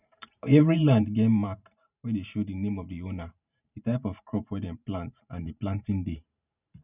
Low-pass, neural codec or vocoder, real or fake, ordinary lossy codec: 3.6 kHz; vocoder, 44.1 kHz, 128 mel bands every 512 samples, BigVGAN v2; fake; none